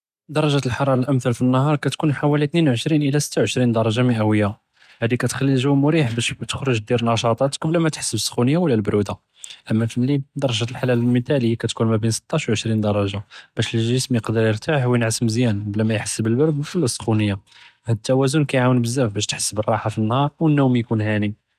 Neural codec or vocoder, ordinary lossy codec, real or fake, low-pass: none; none; real; 14.4 kHz